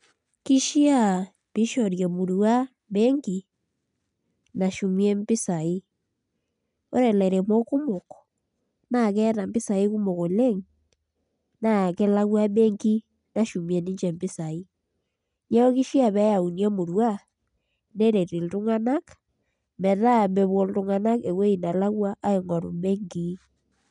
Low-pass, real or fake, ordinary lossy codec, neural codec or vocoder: 10.8 kHz; real; none; none